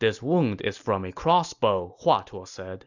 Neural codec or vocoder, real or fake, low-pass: none; real; 7.2 kHz